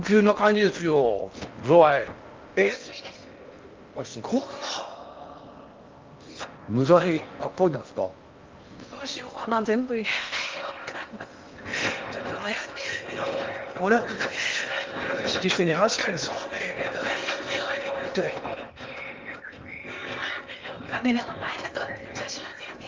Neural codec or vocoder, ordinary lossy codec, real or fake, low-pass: codec, 16 kHz in and 24 kHz out, 0.8 kbps, FocalCodec, streaming, 65536 codes; Opus, 24 kbps; fake; 7.2 kHz